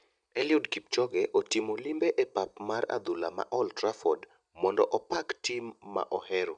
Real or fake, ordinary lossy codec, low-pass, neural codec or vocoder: real; none; 9.9 kHz; none